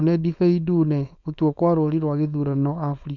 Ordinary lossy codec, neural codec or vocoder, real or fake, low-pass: none; codec, 16 kHz, 4.8 kbps, FACodec; fake; 7.2 kHz